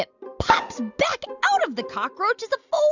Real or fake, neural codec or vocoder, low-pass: real; none; 7.2 kHz